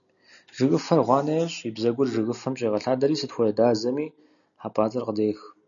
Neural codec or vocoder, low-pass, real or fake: none; 7.2 kHz; real